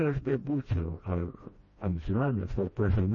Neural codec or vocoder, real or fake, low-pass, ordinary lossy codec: codec, 16 kHz, 1 kbps, FreqCodec, smaller model; fake; 7.2 kHz; MP3, 32 kbps